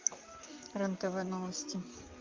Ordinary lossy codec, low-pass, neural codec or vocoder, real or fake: Opus, 24 kbps; 7.2 kHz; vocoder, 44.1 kHz, 128 mel bands, Pupu-Vocoder; fake